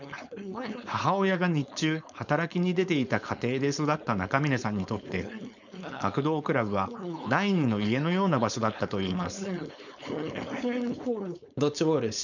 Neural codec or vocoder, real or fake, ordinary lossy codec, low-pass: codec, 16 kHz, 4.8 kbps, FACodec; fake; none; 7.2 kHz